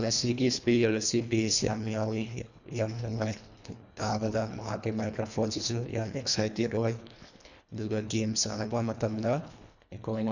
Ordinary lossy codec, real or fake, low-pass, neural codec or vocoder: none; fake; 7.2 kHz; codec, 24 kHz, 1.5 kbps, HILCodec